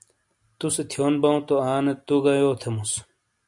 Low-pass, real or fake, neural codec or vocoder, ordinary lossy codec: 10.8 kHz; real; none; MP3, 64 kbps